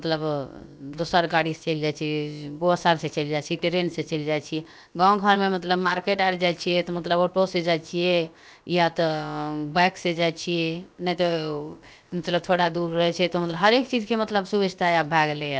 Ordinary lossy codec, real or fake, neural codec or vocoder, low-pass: none; fake; codec, 16 kHz, about 1 kbps, DyCAST, with the encoder's durations; none